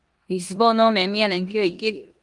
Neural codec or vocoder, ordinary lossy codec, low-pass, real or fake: codec, 16 kHz in and 24 kHz out, 0.9 kbps, LongCat-Audio-Codec, four codebook decoder; Opus, 24 kbps; 10.8 kHz; fake